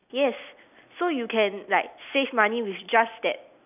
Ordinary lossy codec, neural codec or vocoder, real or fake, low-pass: none; none; real; 3.6 kHz